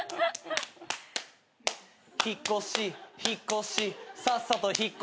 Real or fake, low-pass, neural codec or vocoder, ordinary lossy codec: real; none; none; none